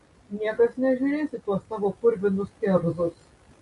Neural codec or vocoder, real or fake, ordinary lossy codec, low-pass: none; real; MP3, 48 kbps; 14.4 kHz